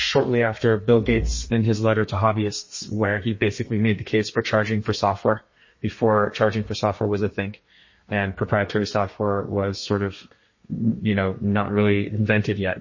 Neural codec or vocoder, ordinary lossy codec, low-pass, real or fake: codec, 32 kHz, 1.9 kbps, SNAC; MP3, 32 kbps; 7.2 kHz; fake